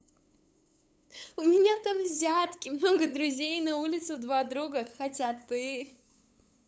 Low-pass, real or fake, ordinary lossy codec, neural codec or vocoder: none; fake; none; codec, 16 kHz, 8 kbps, FunCodec, trained on LibriTTS, 25 frames a second